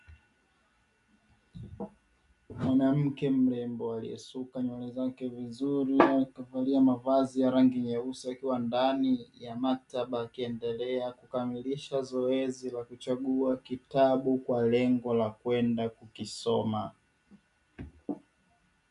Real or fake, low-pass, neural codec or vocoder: real; 10.8 kHz; none